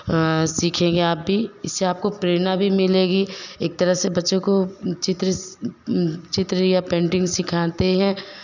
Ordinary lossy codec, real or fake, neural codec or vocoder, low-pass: none; real; none; 7.2 kHz